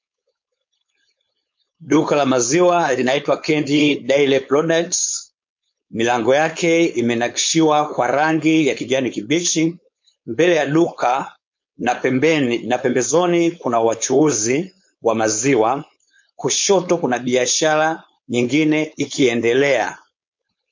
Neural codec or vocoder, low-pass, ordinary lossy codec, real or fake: codec, 16 kHz, 4.8 kbps, FACodec; 7.2 kHz; MP3, 48 kbps; fake